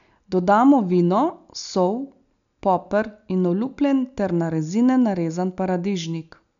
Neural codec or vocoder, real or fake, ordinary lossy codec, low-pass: none; real; none; 7.2 kHz